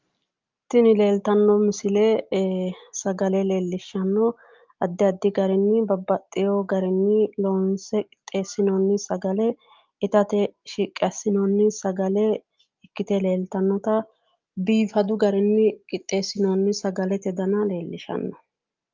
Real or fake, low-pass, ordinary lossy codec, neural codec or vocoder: real; 7.2 kHz; Opus, 24 kbps; none